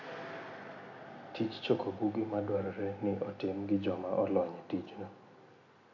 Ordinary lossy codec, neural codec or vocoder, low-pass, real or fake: none; none; 7.2 kHz; real